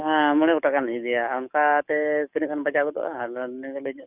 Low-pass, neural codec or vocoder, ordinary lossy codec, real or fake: 3.6 kHz; codec, 44.1 kHz, 7.8 kbps, DAC; none; fake